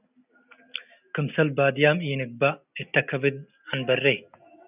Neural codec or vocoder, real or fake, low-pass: none; real; 3.6 kHz